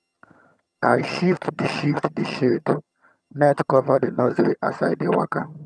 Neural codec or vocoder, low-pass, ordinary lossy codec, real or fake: vocoder, 22.05 kHz, 80 mel bands, HiFi-GAN; none; none; fake